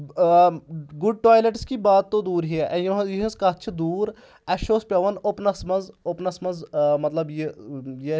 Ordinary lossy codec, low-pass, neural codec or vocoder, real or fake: none; none; none; real